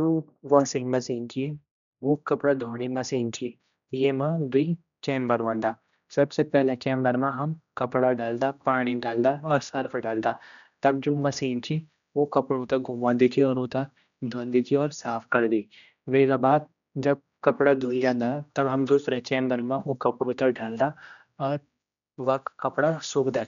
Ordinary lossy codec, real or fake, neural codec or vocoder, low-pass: none; fake; codec, 16 kHz, 1 kbps, X-Codec, HuBERT features, trained on general audio; 7.2 kHz